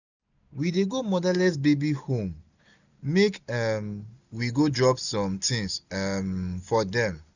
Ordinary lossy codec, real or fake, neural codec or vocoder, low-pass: none; real; none; 7.2 kHz